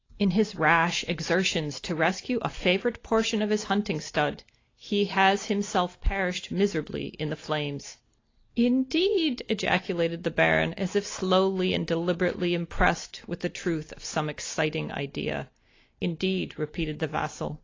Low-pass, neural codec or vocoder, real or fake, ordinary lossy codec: 7.2 kHz; none; real; AAC, 32 kbps